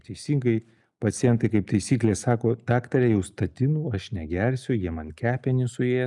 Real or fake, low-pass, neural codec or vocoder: real; 10.8 kHz; none